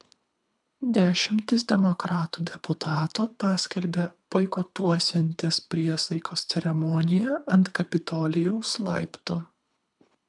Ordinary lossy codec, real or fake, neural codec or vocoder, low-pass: MP3, 96 kbps; fake; codec, 24 kHz, 3 kbps, HILCodec; 10.8 kHz